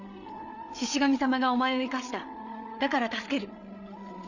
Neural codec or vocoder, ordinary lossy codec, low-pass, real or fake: codec, 16 kHz, 8 kbps, FreqCodec, larger model; none; 7.2 kHz; fake